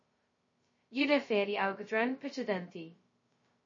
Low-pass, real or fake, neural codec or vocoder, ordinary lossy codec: 7.2 kHz; fake; codec, 16 kHz, 0.2 kbps, FocalCodec; MP3, 32 kbps